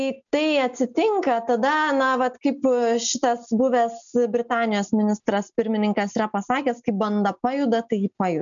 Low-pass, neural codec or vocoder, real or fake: 7.2 kHz; none; real